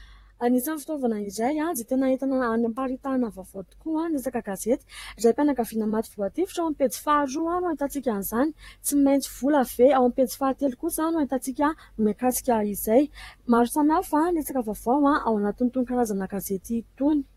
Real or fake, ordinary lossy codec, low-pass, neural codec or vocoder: fake; AAC, 48 kbps; 19.8 kHz; vocoder, 44.1 kHz, 128 mel bands, Pupu-Vocoder